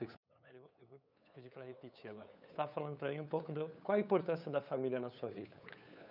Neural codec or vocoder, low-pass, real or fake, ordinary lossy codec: codec, 16 kHz, 8 kbps, FunCodec, trained on LibriTTS, 25 frames a second; 5.4 kHz; fake; none